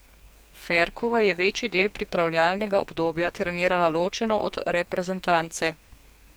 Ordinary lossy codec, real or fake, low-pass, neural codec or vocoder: none; fake; none; codec, 44.1 kHz, 2.6 kbps, SNAC